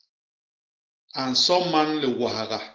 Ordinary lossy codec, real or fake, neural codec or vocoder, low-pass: Opus, 16 kbps; real; none; 7.2 kHz